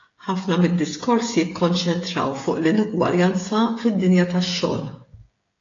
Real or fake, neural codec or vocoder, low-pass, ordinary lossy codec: fake; codec, 16 kHz, 16 kbps, FreqCodec, smaller model; 7.2 kHz; AAC, 32 kbps